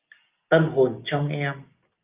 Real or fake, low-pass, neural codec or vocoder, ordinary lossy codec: real; 3.6 kHz; none; Opus, 24 kbps